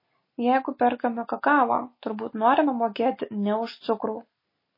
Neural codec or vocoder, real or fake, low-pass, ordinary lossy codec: none; real; 5.4 kHz; MP3, 24 kbps